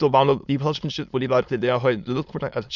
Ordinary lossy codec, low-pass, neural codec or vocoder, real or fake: none; 7.2 kHz; autoencoder, 22.05 kHz, a latent of 192 numbers a frame, VITS, trained on many speakers; fake